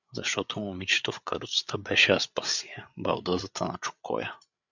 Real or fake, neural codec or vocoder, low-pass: fake; codec, 16 kHz, 8 kbps, FreqCodec, larger model; 7.2 kHz